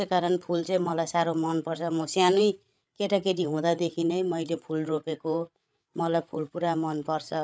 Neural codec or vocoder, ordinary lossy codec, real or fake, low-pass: codec, 16 kHz, 8 kbps, FreqCodec, larger model; none; fake; none